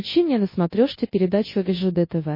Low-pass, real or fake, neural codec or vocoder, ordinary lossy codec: 5.4 kHz; fake; codec, 24 kHz, 0.9 kbps, WavTokenizer, large speech release; MP3, 24 kbps